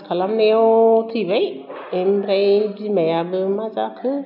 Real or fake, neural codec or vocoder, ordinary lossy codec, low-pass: real; none; none; 5.4 kHz